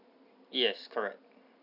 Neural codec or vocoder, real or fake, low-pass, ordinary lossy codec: none; real; 5.4 kHz; none